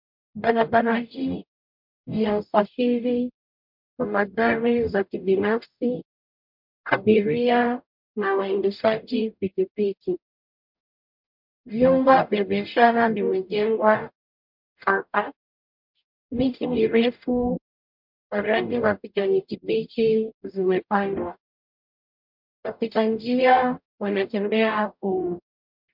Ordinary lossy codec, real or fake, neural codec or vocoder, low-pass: MP3, 48 kbps; fake; codec, 44.1 kHz, 0.9 kbps, DAC; 5.4 kHz